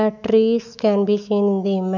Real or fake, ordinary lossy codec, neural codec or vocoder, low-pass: fake; none; autoencoder, 48 kHz, 128 numbers a frame, DAC-VAE, trained on Japanese speech; 7.2 kHz